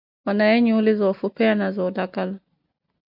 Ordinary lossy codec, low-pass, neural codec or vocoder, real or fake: AAC, 48 kbps; 5.4 kHz; none; real